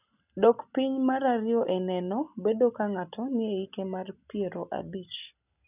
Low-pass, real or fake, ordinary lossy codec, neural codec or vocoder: 3.6 kHz; real; none; none